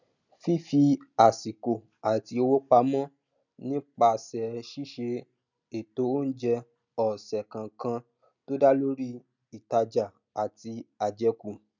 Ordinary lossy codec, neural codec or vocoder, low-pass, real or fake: none; none; 7.2 kHz; real